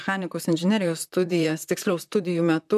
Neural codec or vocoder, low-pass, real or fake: vocoder, 44.1 kHz, 128 mel bands, Pupu-Vocoder; 14.4 kHz; fake